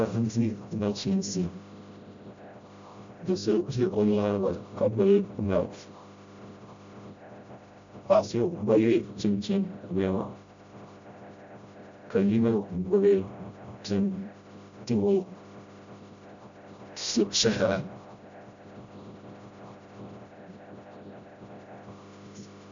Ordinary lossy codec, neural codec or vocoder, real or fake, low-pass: MP3, 64 kbps; codec, 16 kHz, 0.5 kbps, FreqCodec, smaller model; fake; 7.2 kHz